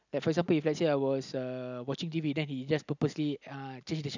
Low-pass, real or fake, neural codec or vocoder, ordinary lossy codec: 7.2 kHz; real; none; none